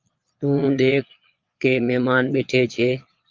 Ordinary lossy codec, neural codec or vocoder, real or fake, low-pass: Opus, 32 kbps; vocoder, 22.05 kHz, 80 mel bands, Vocos; fake; 7.2 kHz